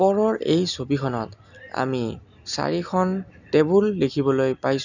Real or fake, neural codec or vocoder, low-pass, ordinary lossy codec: real; none; 7.2 kHz; none